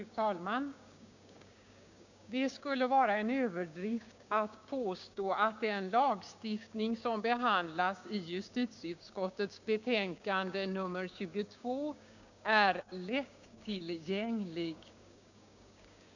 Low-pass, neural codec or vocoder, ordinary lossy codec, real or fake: 7.2 kHz; codec, 16 kHz, 6 kbps, DAC; none; fake